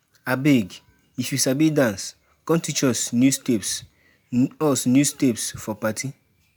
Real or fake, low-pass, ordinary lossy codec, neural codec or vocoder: real; none; none; none